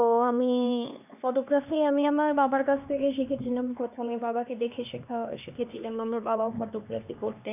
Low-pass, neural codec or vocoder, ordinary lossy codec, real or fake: 3.6 kHz; codec, 16 kHz, 2 kbps, X-Codec, HuBERT features, trained on LibriSpeech; none; fake